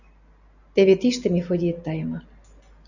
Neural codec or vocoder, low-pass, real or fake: none; 7.2 kHz; real